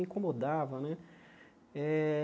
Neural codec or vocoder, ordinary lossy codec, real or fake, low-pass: none; none; real; none